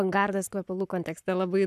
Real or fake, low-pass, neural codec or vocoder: fake; 14.4 kHz; codec, 44.1 kHz, 7.8 kbps, Pupu-Codec